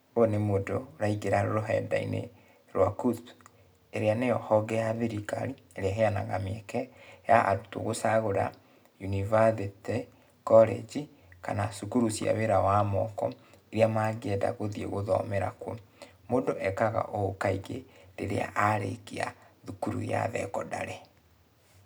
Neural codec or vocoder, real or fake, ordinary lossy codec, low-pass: none; real; none; none